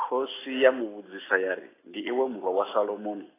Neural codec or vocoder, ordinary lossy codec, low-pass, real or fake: none; AAC, 16 kbps; 3.6 kHz; real